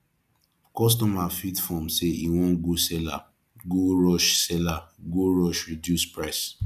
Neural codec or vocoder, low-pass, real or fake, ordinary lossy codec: none; 14.4 kHz; real; none